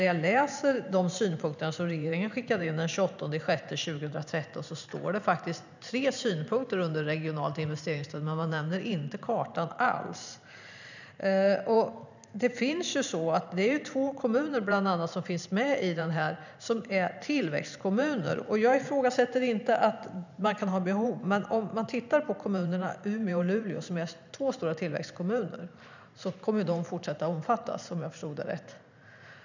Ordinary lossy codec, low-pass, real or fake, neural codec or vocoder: none; 7.2 kHz; fake; vocoder, 44.1 kHz, 128 mel bands every 512 samples, BigVGAN v2